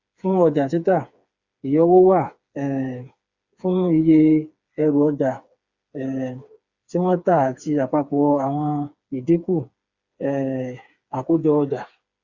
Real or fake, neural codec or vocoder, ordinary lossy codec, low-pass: fake; codec, 16 kHz, 4 kbps, FreqCodec, smaller model; Opus, 64 kbps; 7.2 kHz